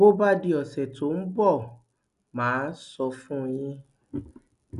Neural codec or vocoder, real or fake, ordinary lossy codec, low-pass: none; real; none; 10.8 kHz